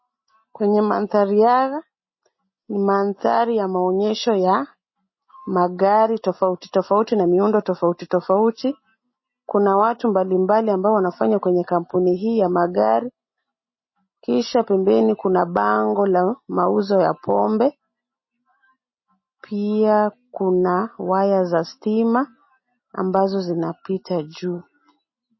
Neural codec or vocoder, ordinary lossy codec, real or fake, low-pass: none; MP3, 24 kbps; real; 7.2 kHz